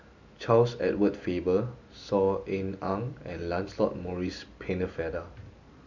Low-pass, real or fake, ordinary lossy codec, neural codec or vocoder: 7.2 kHz; real; none; none